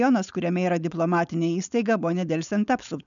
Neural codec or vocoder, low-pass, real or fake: codec, 16 kHz, 4.8 kbps, FACodec; 7.2 kHz; fake